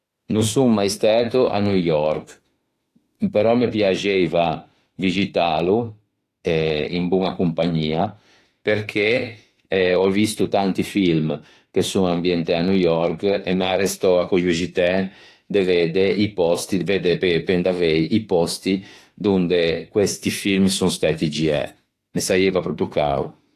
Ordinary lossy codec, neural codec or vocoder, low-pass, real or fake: AAC, 48 kbps; autoencoder, 48 kHz, 32 numbers a frame, DAC-VAE, trained on Japanese speech; 14.4 kHz; fake